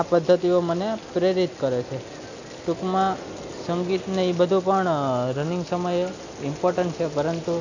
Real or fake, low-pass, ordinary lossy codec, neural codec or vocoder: real; 7.2 kHz; none; none